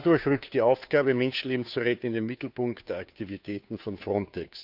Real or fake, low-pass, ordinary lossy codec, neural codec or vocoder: fake; 5.4 kHz; none; codec, 16 kHz, 2 kbps, FunCodec, trained on Chinese and English, 25 frames a second